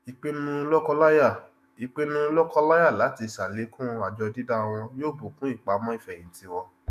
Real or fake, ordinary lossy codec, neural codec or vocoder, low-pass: fake; none; autoencoder, 48 kHz, 128 numbers a frame, DAC-VAE, trained on Japanese speech; 14.4 kHz